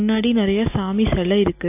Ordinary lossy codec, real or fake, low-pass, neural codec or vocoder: AAC, 24 kbps; real; 3.6 kHz; none